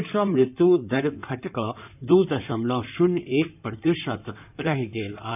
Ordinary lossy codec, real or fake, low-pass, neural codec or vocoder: none; fake; 3.6 kHz; codec, 16 kHz in and 24 kHz out, 2.2 kbps, FireRedTTS-2 codec